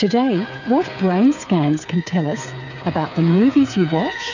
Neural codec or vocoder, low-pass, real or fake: codec, 16 kHz, 8 kbps, FreqCodec, smaller model; 7.2 kHz; fake